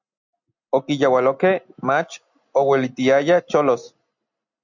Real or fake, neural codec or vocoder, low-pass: real; none; 7.2 kHz